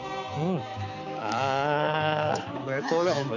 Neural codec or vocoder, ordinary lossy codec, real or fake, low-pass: codec, 16 kHz, 4 kbps, X-Codec, HuBERT features, trained on balanced general audio; none; fake; 7.2 kHz